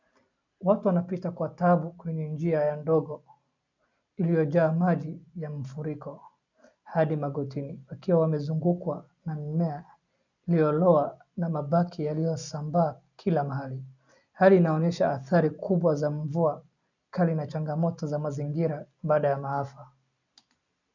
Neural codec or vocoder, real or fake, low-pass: none; real; 7.2 kHz